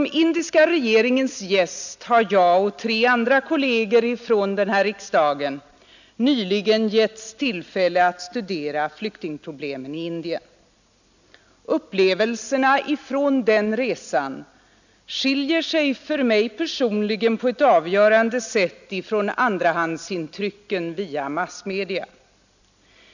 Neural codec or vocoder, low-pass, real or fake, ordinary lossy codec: none; 7.2 kHz; real; none